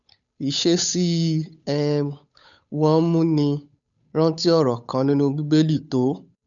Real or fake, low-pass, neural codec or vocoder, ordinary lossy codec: fake; 7.2 kHz; codec, 16 kHz, 8 kbps, FunCodec, trained on Chinese and English, 25 frames a second; none